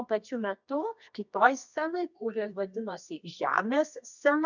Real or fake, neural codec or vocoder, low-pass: fake; codec, 24 kHz, 0.9 kbps, WavTokenizer, medium music audio release; 7.2 kHz